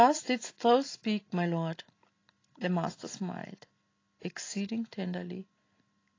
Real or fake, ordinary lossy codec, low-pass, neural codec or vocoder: real; AAC, 32 kbps; 7.2 kHz; none